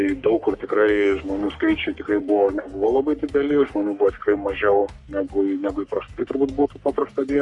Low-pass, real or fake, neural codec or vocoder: 10.8 kHz; fake; codec, 44.1 kHz, 3.4 kbps, Pupu-Codec